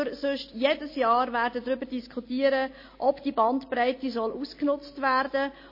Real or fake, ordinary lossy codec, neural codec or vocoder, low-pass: real; MP3, 24 kbps; none; 5.4 kHz